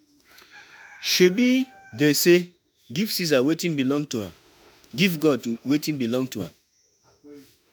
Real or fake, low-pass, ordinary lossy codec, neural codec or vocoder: fake; none; none; autoencoder, 48 kHz, 32 numbers a frame, DAC-VAE, trained on Japanese speech